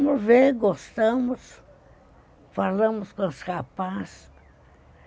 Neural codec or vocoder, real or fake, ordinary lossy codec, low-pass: none; real; none; none